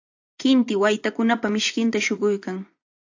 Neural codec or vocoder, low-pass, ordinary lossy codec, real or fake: vocoder, 24 kHz, 100 mel bands, Vocos; 7.2 kHz; AAC, 48 kbps; fake